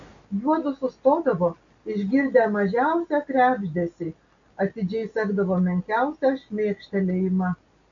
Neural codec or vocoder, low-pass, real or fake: none; 7.2 kHz; real